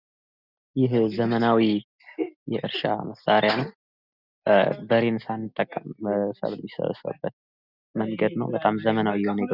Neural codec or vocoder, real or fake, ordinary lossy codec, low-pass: none; real; Opus, 64 kbps; 5.4 kHz